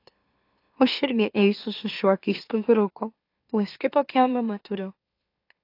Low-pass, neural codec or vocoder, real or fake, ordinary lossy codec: 5.4 kHz; autoencoder, 44.1 kHz, a latent of 192 numbers a frame, MeloTTS; fake; AAC, 32 kbps